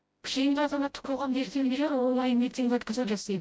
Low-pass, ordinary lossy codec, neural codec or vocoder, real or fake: none; none; codec, 16 kHz, 0.5 kbps, FreqCodec, smaller model; fake